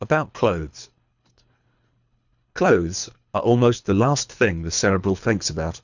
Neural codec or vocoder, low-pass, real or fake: codec, 24 kHz, 3 kbps, HILCodec; 7.2 kHz; fake